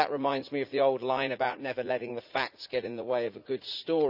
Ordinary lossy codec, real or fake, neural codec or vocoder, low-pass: none; fake; vocoder, 44.1 kHz, 80 mel bands, Vocos; 5.4 kHz